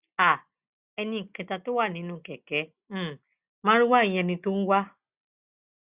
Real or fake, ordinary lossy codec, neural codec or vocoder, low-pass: real; Opus, 64 kbps; none; 3.6 kHz